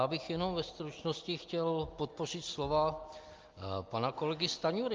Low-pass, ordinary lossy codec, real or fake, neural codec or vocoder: 7.2 kHz; Opus, 32 kbps; real; none